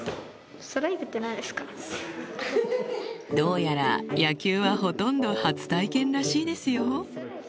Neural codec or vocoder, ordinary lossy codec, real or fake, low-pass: none; none; real; none